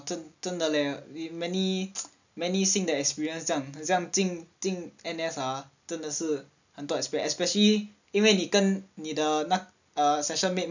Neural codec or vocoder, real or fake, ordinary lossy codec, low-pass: none; real; none; 7.2 kHz